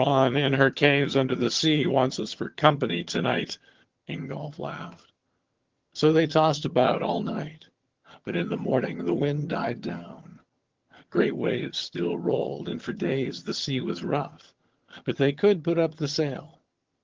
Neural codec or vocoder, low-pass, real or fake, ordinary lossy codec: vocoder, 22.05 kHz, 80 mel bands, HiFi-GAN; 7.2 kHz; fake; Opus, 16 kbps